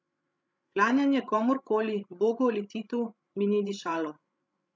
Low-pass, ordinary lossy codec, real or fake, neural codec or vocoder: none; none; fake; codec, 16 kHz, 16 kbps, FreqCodec, larger model